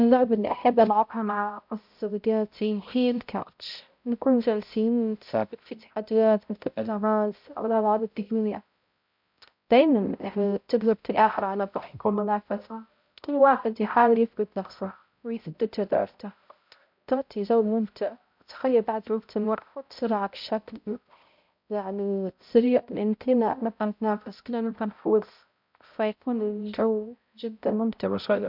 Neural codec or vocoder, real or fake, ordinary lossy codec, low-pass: codec, 16 kHz, 0.5 kbps, X-Codec, HuBERT features, trained on balanced general audio; fake; MP3, 48 kbps; 5.4 kHz